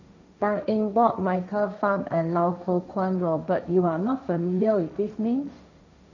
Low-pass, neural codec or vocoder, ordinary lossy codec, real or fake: 7.2 kHz; codec, 16 kHz, 1.1 kbps, Voila-Tokenizer; none; fake